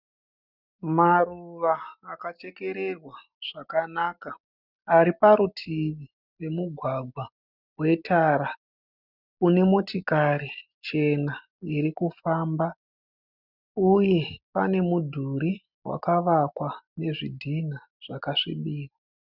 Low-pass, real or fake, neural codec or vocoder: 5.4 kHz; real; none